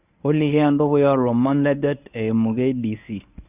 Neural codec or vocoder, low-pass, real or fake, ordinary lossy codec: codec, 24 kHz, 0.9 kbps, WavTokenizer, medium speech release version 1; 3.6 kHz; fake; none